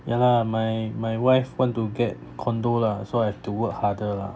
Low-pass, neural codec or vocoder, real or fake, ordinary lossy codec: none; none; real; none